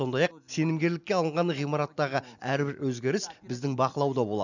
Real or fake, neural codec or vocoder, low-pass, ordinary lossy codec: real; none; 7.2 kHz; none